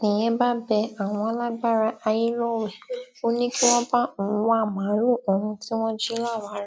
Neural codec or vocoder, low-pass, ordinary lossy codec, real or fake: none; none; none; real